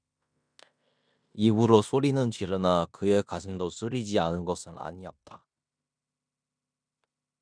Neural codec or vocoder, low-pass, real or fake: codec, 16 kHz in and 24 kHz out, 0.9 kbps, LongCat-Audio-Codec, fine tuned four codebook decoder; 9.9 kHz; fake